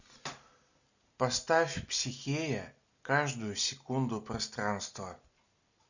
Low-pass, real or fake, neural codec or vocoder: 7.2 kHz; real; none